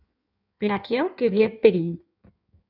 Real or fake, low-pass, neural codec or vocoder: fake; 5.4 kHz; codec, 16 kHz in and 24 kHz out, 1.1 kbps, FireRedTTS-2 codec